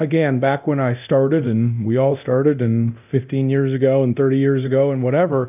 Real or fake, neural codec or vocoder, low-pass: fake; codec, 24 kHz, 0.9 kbps, DualCodec; 3.6 kHz